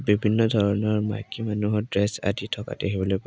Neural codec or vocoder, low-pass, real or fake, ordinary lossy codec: none; none; real; none